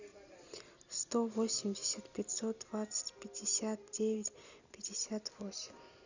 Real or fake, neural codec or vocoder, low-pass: real; none; 7.2 kHz